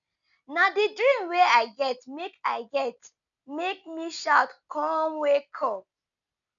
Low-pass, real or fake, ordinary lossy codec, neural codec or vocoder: 7.2 kHz; real; none; none